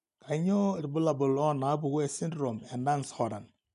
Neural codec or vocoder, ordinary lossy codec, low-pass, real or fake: none; none; 10.8 kHz; real